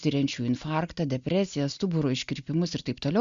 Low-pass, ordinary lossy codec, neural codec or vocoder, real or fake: 7.2 kHz; Opus, 64 kbps; none; real